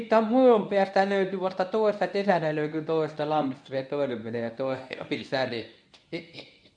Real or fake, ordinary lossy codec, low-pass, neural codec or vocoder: fake; none; 9.9 kHz; codec, 24 kHz, 0.9 kbps, WavTokenizer, medium speech release version 1